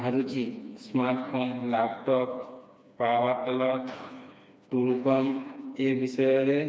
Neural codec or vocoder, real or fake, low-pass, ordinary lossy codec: codec, 16 kHz, 2 kbps, FreqCodec, smaller model; fake; none; none